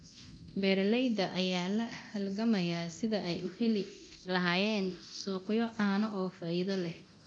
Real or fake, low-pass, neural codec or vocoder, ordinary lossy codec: fake; 9.9 kHz; codec, 24 kHz, 0.9 kbps, DualCodec; none